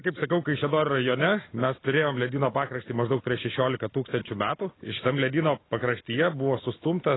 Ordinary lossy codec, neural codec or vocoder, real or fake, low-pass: AAC, 16 kbps; none; real; 7.2 kHz